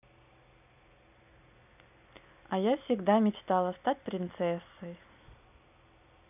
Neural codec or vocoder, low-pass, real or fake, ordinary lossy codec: none; 3.6 kHz; real; none